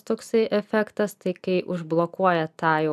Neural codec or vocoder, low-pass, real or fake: none; 14.4 kHz; real